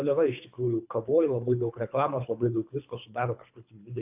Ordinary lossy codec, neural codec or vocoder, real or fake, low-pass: AAC, 32 kbps; codec, 24 kHz, 3 kbps, HILCodec; fake; 3.6 kHz